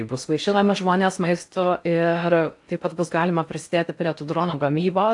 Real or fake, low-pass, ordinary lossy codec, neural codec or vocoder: fake; 10.8 kHz; AAC, 64 kbps; codec, 16 kHz in and 24 kHz out, 0.6 kbps, FocalCodec, streaming, 4096 codes